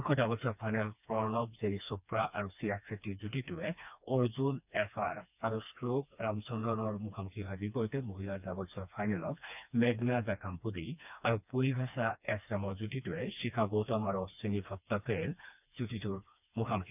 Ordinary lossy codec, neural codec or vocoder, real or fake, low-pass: none; codec, 16 kHz, 2 kbps, FreqCodec, smaller model; fake; 3.6 kHz